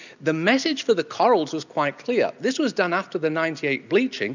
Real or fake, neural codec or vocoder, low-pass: real; none; 7.2 kHz